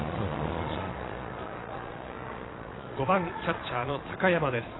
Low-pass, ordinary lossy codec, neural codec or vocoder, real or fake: 7.2 kHz; AAC, 16 kbps; vocoder, 22.05 kHz, 80 mel bands, Vocos; fake